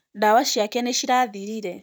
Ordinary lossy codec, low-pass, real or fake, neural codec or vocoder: none; none; fake; vocoder, 44.1 kHz, 128 mel bands, Pupu-Vocoder